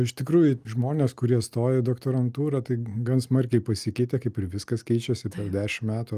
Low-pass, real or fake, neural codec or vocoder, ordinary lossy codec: 14.4 kHz; real; none; Opus, 32 kbps